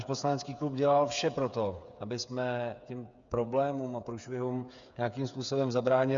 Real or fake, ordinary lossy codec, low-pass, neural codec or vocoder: fake; Opus, 64 kbps; 7.2 kHz; codec, 16 kHz, 8 kbps, FreqCodec, smaller model